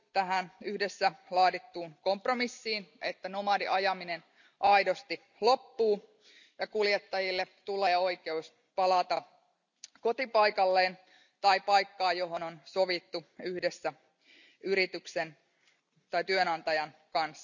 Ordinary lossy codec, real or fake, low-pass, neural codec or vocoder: none; real; 7.2 kHz; none